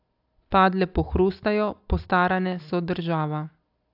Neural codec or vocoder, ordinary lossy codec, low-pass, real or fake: none; AAC, 48 kbps; 5.4 kHz; real